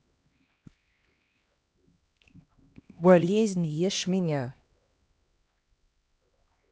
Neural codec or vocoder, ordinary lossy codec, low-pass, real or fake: codec, 16 kHz, 1 kbps, X-Codec, HuBERT features, trained on LibriSpeech; none; none; fake